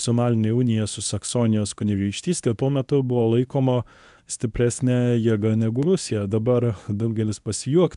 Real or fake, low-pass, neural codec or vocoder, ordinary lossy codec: fake; 10.8 kHz; codec, 24 kHz, 0.9 kbps, WavTokenizer, medium speech release version 1; MP3, 96 kbps